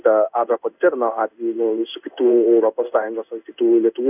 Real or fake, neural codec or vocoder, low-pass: fake; codec, 16 kHz in and 24 kHz out, 1 kbps, XY-Tokenizer; 3.6 kHz